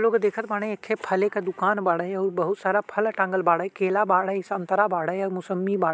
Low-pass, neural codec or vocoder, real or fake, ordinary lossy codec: none; none; real; none